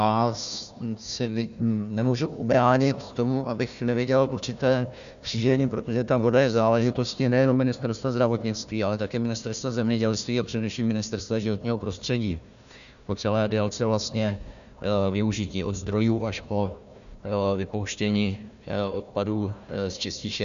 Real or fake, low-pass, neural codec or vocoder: fake; 7.2 kHz; codec, 16 kHz, 1 kbps, FunCodec, trained on Chinese and English, 50 frames a second